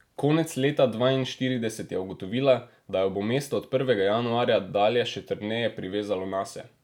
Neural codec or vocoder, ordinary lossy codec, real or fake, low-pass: none; none; real; 19.8 kHz